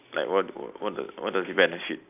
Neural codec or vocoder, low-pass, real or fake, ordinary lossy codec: none; 3.6 kHz; real; AAC, 32 kbps